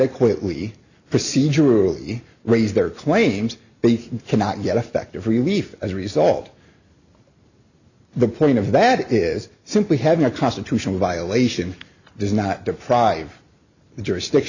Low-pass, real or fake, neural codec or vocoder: 7.2 kHz; real; none